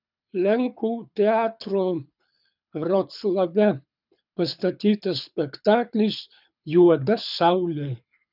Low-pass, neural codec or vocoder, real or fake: 5.4 kHz; codec, 24 kHz, 6 kbps, HILCodec; fake